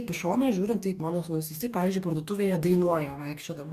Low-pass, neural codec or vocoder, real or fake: 14.4 kHz; codec, 44.1 kHz, 2.6 kbps, DAC; fake